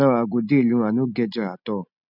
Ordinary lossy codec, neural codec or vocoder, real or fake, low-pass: none; none; real; 5.4 kHz